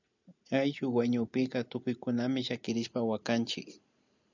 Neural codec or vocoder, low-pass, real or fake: none; 7.2 kHz; real